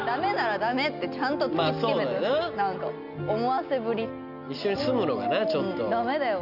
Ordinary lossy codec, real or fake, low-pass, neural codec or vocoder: none; real; 5.4 kHz; none